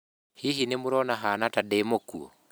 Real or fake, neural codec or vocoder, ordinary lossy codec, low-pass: real; none; none; none